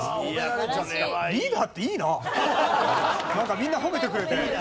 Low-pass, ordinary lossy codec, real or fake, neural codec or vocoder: none; none; real; none